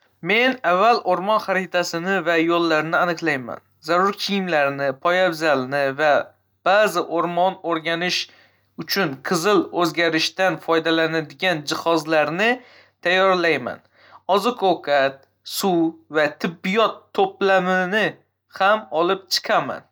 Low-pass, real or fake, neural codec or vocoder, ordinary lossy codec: none; real; none; none